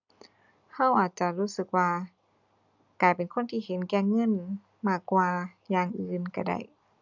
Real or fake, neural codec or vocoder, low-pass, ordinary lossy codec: real; none; 7.2 kHz; none